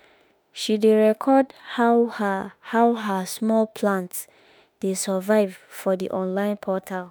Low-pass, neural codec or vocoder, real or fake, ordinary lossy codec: none; autoencoder, 48 kHz, 32 numbers a frame, DAC-VAE, trained on Japanese speech; fake; none